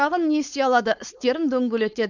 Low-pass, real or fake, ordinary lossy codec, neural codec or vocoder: 7.2 kHz; fake; none; codec, 16 kHz, 4.8 kbps, FACodec